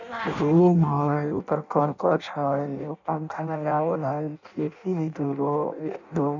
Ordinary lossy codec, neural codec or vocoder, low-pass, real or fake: none; codec, 16 kHz in and 24 kHz out, 0.6 kbps, FireRedTTS-2 codec; 7.2 kHz; fake